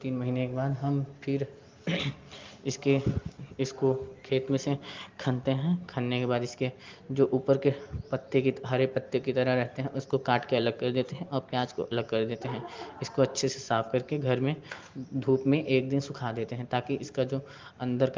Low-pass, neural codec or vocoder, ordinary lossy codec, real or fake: 7.2 kHz; none; Opus, 32 kbps; real